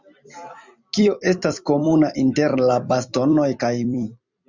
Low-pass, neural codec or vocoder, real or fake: 7.2 kHz; vocoder, 44.1 kHz, 128 mel bands every 256 samples, BigVGAN v2; fake